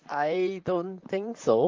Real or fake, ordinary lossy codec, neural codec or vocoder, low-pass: fake; Opus, 32 kbps; vocoder, 44.1 kHz, 128 mel bands, Pupu-Vocoder; 7.2 kHz